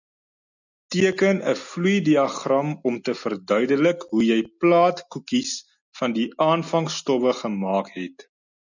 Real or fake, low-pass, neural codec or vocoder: real; 7.2 kHz; none